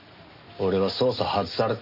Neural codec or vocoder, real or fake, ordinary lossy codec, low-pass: none; real; none; 5.4 kHz